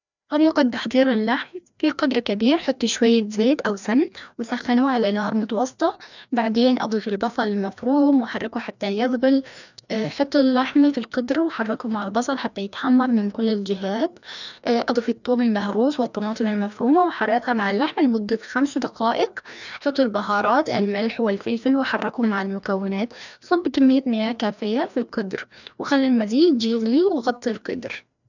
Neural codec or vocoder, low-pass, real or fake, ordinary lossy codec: codec, 16 kHz, 1 kbps, FreqCodec, larger model; 7.2 kHz; fake; none